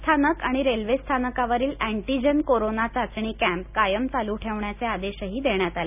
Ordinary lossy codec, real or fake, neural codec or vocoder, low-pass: none; real; none; 3.6 kHz